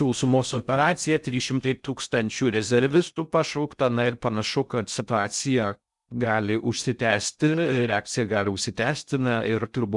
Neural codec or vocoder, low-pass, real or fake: codec, 16 kHz in and 24 kHz out, 0.6 kbps, FocalCodec, streaming, 2048 codes; 10.8 kHz; fake